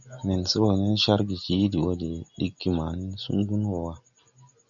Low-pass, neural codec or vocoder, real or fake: 7.2 kHz; none; real